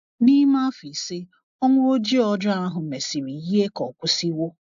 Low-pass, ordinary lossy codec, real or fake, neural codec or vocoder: 7.2 kHz; AAC, 64 kbps; real; none